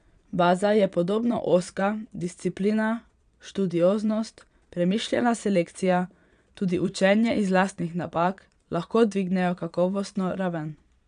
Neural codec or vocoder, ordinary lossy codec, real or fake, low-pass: vocoder, 22.05 kHz, 80 mel bands, Vocos; none; fake; 9.9 kHz